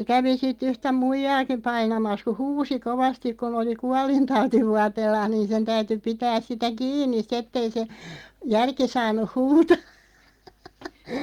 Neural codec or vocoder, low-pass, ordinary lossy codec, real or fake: none; 19.8 kHz; Opus, 32 kbps; real